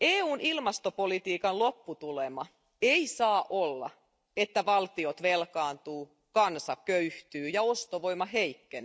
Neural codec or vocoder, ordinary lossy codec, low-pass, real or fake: none; none; none; real